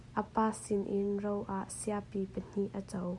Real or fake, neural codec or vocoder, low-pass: real; none; 10.8 kHz